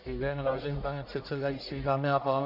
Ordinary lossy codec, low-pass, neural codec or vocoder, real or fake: AAC, 48 kbps; 5.4 kHz; codec, 44.1 kHz, 1.7 kbps, Pupu-Codec; fake